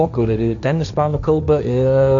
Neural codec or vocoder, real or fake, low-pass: codec, 16 kHz, 1.1 kbps, Voila-Tokenizer; fake; 7.2 kHz